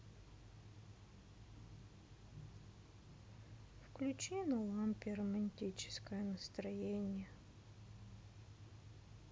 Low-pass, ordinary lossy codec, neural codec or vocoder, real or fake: none; none; none; real